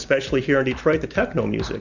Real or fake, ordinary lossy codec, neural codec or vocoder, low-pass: real; Opus, 64 kbps; none; 7.2 kHz